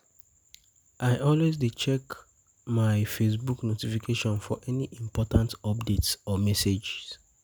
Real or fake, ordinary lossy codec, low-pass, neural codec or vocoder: real; none; none; none